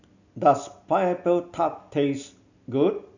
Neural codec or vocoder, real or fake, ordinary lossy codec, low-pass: none; real; none; 7.2 kHz